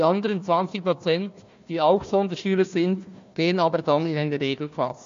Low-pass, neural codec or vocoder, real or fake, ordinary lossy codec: 7.2 kHz; codec, 16 kHz, 1 kbps, FunCodec, trained on Chinese and English, 50 frames a second; fake; MP3, 48 kbps